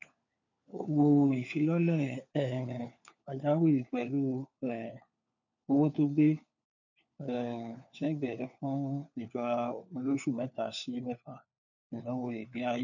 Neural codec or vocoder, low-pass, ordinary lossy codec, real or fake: codec, 16 kHz, 4 kbps, FunCodec, trained on LibriTTS, 50 frames a second; 7.2 kHz; none; fake